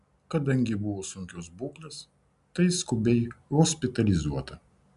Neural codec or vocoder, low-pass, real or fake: none; 10.8 kHz; real